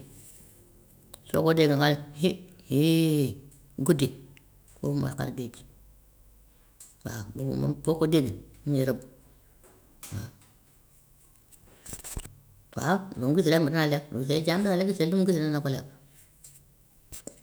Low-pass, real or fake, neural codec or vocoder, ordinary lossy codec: none; fake; autoencoder, 48 kHz, 128 numbers a frame, DAC-VAE, trained on Japanese speech; none